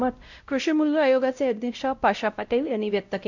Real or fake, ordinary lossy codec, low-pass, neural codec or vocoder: fake; none; 7.2 kHz; codec, 16 kHz, 0.5 kbps, X-Codec, WavLM features, trained on Multilingual LibriSpeech